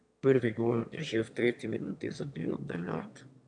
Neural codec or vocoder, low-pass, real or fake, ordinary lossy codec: autoencoder, 22.05 kHz, a latent of 192 numbers a frame, VITS, trained on one speaker; 9.9 kHz; fake; none